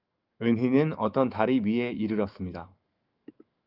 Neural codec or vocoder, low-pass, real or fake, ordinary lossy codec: autoencoder, 48 kHz, 128 numbers a frame, DAC-VAE, trained on Japanese speech; 5.4 kHz; fake; Opus, 24 kbps